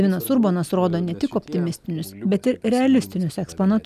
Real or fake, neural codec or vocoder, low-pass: real; none; 14.4 kHz